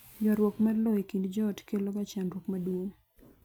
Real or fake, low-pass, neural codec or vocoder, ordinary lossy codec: real; none; none; none